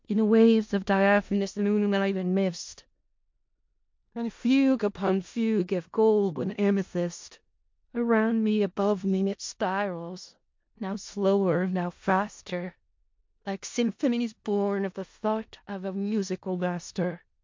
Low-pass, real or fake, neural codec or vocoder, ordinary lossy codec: 7.2 kHz; fake; codec, 16 kHz in and 24 kHz out, 0.4 kbps, LongCat-Audio-Codec, four codebook decoder; MP3, 48 kbps